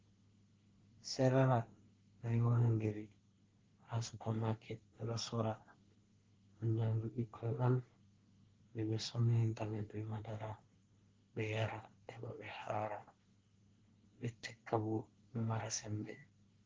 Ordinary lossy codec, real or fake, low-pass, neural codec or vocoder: Opus, 16 kbps; fake; 7.2 kHz; codec, 24 kHz, 1 kbps, SNAC